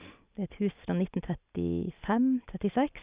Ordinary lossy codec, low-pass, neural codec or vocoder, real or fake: Opus, 64 kbps; 3.6 kHz; none; real